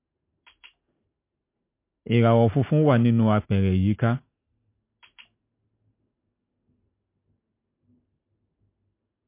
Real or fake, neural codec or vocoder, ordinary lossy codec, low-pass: real; none; MP3, 24 kbps; 3.6 kHz